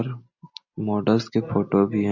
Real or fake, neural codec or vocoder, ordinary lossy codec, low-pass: real; none; MP3, 32 kbps; 7.2 kHz